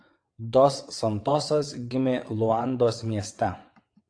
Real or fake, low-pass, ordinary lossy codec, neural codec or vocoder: fake; 9.9 kHz; AAC, 48 kbps; vocoder, 22.05 kHz, 80 mel bands, WaveNeXt